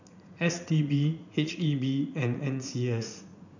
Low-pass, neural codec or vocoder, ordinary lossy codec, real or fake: 7.2 kHz; vocoder, 44.1 kHz, 80 mel bands, Vocos; none; fake